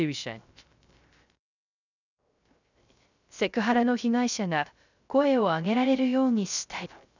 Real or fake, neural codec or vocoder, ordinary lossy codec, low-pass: fake; codec, 16 kHz, 0.3 kbps, FocalCodec; none; 7.2 kHz